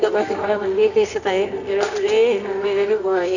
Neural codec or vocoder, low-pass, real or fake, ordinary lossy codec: codec, 24 kHz, 0.9 kbps, WavTokenizer, medium music audio release; 7.2 kHz; fake; none